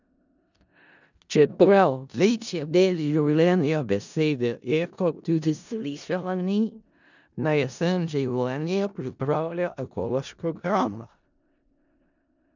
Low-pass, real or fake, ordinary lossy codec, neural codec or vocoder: 7.2 kHz; fake; none; codec, 16 kHz in and 24 kHz out, 0.4 kbps, LongCat-Audio-Codec, four codebook decoder